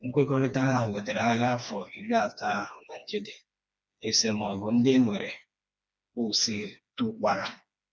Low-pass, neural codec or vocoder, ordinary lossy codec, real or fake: none; codec, 16 kHz, 2 kbps, FreqCodec, smaller model; none; fake